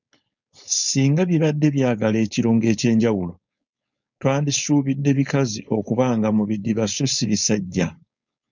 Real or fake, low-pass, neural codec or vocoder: fake; 7.2 kHz; codec, 16 kHz, 4.8 kbps, FACodec